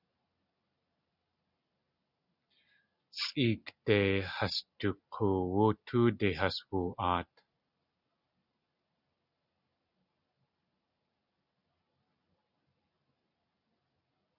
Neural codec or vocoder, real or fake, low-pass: none; real; 5.4 kHz